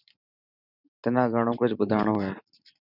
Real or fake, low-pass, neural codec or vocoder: fake; 5.4 kHz; vocoder, 44.1 kHz, 128 mel bands every 512 samples, BigVGAN v2